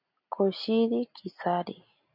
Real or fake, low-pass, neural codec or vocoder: real; 5.4 kHz; none